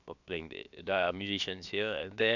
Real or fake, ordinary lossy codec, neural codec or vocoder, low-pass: fake; none; codec, 16 kHz, about 1 kbps, DyCAST, with the encoder's durations; 7.2 kHz